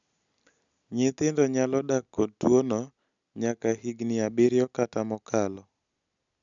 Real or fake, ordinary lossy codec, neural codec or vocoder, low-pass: real; MP3, 96 kbps; none; 7.2 kHz